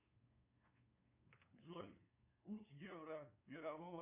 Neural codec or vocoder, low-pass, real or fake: codec, 16 kHz, 2 kbps, FunCodec, trained on LibriTTS, 25 frames a second; 3.6 kHz; fake